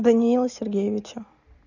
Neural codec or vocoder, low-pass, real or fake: none; 7.2 kHz; real